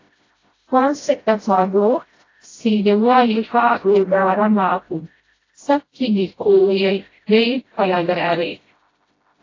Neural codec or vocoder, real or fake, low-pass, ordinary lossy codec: codec, 16 kHz, 0.5 kbps, FreqCodec, smaller model; fake; 7.2 kHz; AAC, 32 kbps